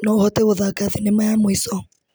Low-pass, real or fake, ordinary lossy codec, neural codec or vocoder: none; real; none; none